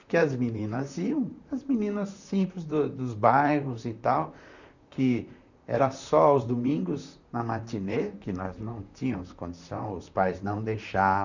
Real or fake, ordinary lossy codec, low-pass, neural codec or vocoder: fake; none; 7.2 kHz; vocoder, 44.1 kHz, 128 mel bands, Pupu-Vocoder